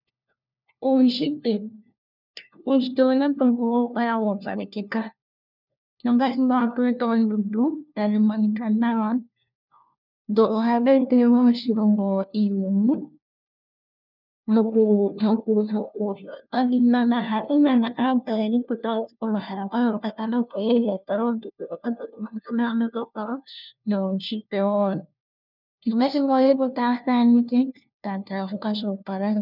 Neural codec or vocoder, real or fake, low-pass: codec, 16 kHz, 1 kbps, FunCodec, trained on LibriTTS, 50 frames a second; fake; 5.4 kHz